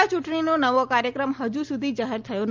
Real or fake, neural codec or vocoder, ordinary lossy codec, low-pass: real; none; Opus, 32 kbps; 7.2 kHz